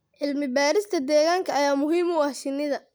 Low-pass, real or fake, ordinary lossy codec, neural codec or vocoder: none; real; none; none